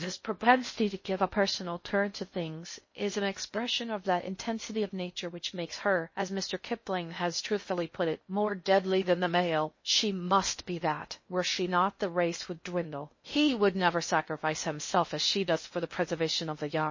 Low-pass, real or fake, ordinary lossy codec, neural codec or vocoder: 7.2 kHz; fake; MP3, 32 kbps; codec, 16 kHz in and 24 kHz out, 0.6 kbps, FocalCodec, streaming, 4096 codes